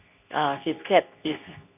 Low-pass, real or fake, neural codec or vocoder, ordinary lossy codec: 3.6 kHz; fake; codec, 24 kHz, 0.9 kbps, WavTokenizer, medium speech release version 2; none